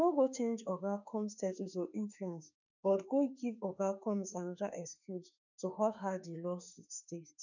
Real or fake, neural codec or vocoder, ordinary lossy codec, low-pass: fake; autoencoder, 48 kHz, 32 numbers a frame, DAC-VAE, trained on Japanese speech; none; 7.2 kHz